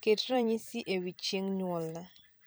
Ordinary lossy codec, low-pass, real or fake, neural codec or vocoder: none; none; fake; vocoder, 44.1 kHz, 128 mel bands every 256 samples, BigVGAN v2